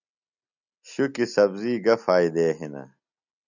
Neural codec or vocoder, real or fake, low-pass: none; real; 7.2 kHz